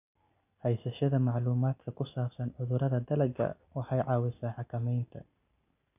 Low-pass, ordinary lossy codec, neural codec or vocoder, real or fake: 3.6 kHz; none; none; real